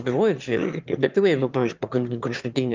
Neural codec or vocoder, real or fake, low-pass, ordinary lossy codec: autoencoder, 22.05 kHz, a latent of 192 numbers a frame, VITS, trained on one speaker; fake; 7.2 kHz; Opus, 24 kbps